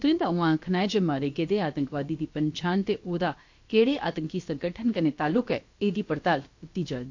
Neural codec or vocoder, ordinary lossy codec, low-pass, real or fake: codec, 16 kHz, about 1 kbps, DyCAST, with the encoder's durations; MP3, 48 kbps; 7.2 kHz; fake